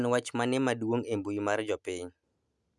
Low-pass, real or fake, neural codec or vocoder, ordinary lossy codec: none; real; none; none